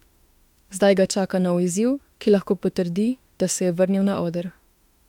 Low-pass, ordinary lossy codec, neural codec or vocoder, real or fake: 19.8 kHz; MP3, 96 kbps; autoencoder, 48 kHz, 32 numbers a frame, DAC-VAE, trained on Japanese speech; fake